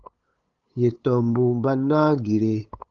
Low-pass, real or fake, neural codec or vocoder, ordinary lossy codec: 7.2 kHz; fake; codec, 16 kHz, 8 kbps, FunCodec, trained on LibriTTS, 25 frames a second; Opus, 32 kbps